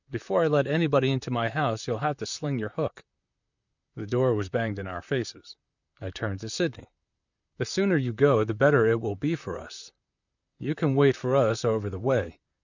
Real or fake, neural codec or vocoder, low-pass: fake; vocoder, 44.1 kHz, 128 mel bands, Pupu-Vocoder; 7.2 kHz